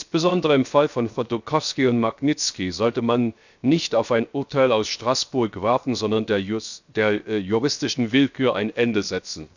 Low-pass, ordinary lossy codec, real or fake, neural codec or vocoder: 7.2 kHz; none; fake; codec, 16 kHz, 0.7 kbps, FocalCodec